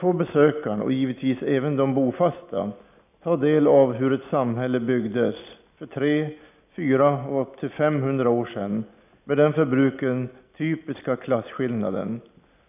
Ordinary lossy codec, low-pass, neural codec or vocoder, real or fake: none; 3.6 kHz; none; real